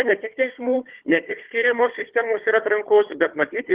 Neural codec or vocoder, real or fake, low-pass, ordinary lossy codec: codec, 16 kHz, 4 kbps, FunCodec, trained on Chinese and English, 50 frames a second; fake; 3.6 kHz; Opus, 16 kbps